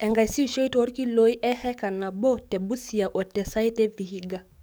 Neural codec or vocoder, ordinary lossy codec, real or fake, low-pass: codec, 44.1 kHz, 7.8 kbps, DAC; none; fake; none